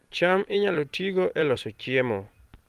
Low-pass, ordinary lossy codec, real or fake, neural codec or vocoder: 14.4 kHz; Opus, 24 kbps; fake; vocoder, 44.1 kHz, 128 mel bands every 256 samples, BigVGAN v2